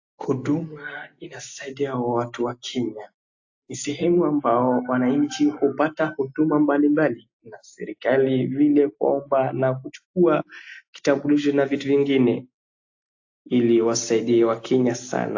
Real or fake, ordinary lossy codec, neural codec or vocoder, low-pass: real; AAC, 48 kbps; none; 7.2 kHz